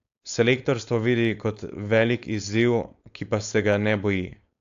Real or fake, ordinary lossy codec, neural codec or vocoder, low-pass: fake; AAC, 64 kbps; codec, 16 kHz, 4.8 kbps, FACodec; 7.2 kHz